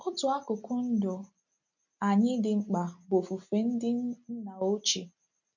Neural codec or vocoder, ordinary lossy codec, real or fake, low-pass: none; none; real; 7.2 kHz